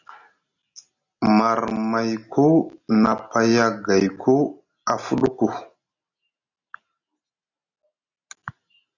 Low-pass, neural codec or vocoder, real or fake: 7.2 kHz; none; real